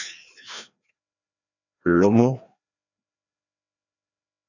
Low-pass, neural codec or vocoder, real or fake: 7.2 kHz; codec, 16 kHz, 1 kbps, FreqCodec, larger model; fake